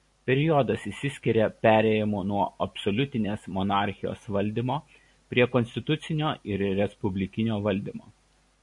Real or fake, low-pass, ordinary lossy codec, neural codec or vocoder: real; 10.8 kHz; MP3, 48 kbps; none